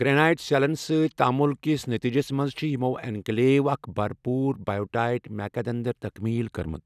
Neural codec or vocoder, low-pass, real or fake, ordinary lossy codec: none; 14.4 kHz; real; none